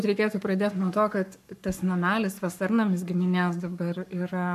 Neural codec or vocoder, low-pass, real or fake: codec, 44.1 kHz, 7.8 kbps, Pupu-Codec; 14.4 kHz; fake